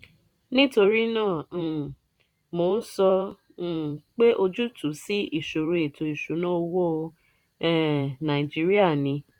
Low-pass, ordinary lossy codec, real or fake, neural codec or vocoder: 19.8 kHz; none; fake; vocoder, 44.1 kHz, 128 mel bands every 512 samples, BigVGAN v2